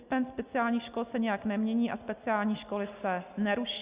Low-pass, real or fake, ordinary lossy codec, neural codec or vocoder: 3.6 kHz; real; Opus, 64 kbps; none